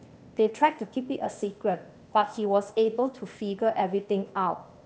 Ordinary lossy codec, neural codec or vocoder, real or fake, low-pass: none; codec, 16 kHz, 0.8 kbps, ZipCodec; fake; none